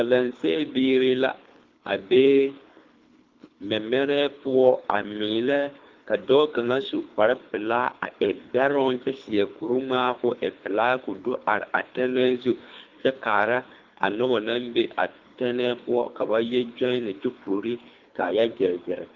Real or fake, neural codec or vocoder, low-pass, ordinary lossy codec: fake; codec, 24 kHz, 3 kbps, HILCodec; 7.2 kHz; Opus, 24 kbps